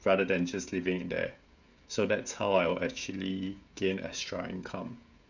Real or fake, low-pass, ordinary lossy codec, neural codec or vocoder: fake; 7.2 kHz; none; codec, 16 kHz, 16 kbps, FreqCodec, smaller model